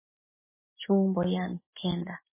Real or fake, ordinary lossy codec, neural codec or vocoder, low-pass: real; MP3, 16 kbps; none; 3.6 kHz